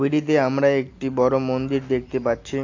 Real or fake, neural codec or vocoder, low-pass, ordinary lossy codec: real; none; 7.2 kHz; AAC, 48 kbps